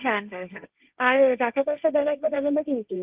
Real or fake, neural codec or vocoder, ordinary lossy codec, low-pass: fake; codec, 16 kHz, 1.1 kbps, Voila-Tokenizer; Opus, 24 kbps; 3.6 kHz